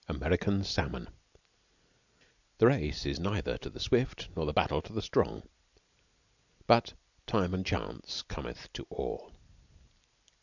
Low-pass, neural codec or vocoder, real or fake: 7.2 kHz; vocoder, 44.1 kHz, 128 mel bands every 256 samples, BigVGAN v2; fake